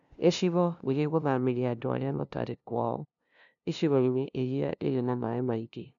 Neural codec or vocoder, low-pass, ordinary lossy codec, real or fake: codec, 16 kHz, 0.5 kbps, FunCodec, trained on LibriTTS, 25 frames a second; 7.2 kHz; none; fake